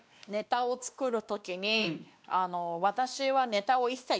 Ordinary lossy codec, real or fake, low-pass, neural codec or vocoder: none; fake; none; codec, 16 kHz, 2 kbps, X-Codec, WavLM features, trained on Multilingual LibriSpeech